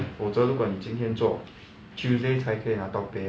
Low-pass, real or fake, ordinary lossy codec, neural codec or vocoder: none; real; none; none